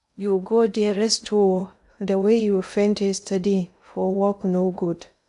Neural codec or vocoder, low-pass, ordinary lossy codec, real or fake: codec, 16 kHz in and 24 kHz out, 0.6 kbps, FocalCodec, streaming, 2048 codes; 10.8 kHz; none; fake